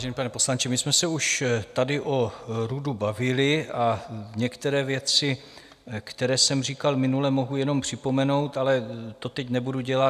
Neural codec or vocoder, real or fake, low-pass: none; real; 14.4 kHz